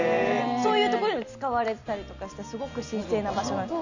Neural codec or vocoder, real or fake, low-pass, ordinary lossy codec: none; real; 7.2 kHz; Opus, 64 kbps